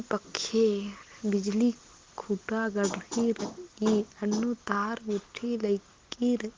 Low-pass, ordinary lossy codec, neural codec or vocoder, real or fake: 7.2 kHz; Opus, 16 kbps; none; real